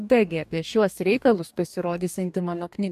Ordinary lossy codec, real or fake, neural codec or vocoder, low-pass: AAC, 96 kbps; fake; codec, 44.1 kHz, 2.6 kbps, DAC; 14.4 kHz